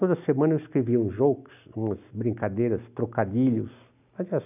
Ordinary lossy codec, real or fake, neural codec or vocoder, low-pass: none; real; none; 3.6 kHz